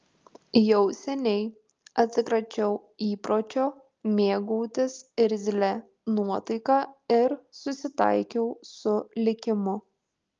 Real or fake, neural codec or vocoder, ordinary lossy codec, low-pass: real; none; Opus, 24 kbps; 7.2 kHz